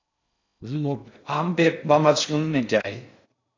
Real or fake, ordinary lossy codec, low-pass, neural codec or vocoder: fake; AAC, 48 kbps; 7.2 kHz; codec, 16 kHz in and 24 kHz out, 0.6 kbps, FocalCodec, streaming, 2048 codes